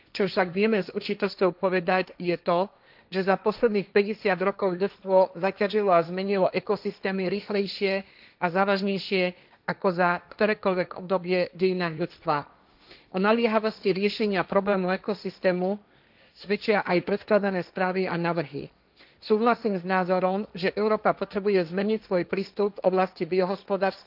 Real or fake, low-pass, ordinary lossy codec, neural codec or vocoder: fake; 5.4 kHz; none; codec, 16 kHz, 1.1 kbps, Voila-Tokenizer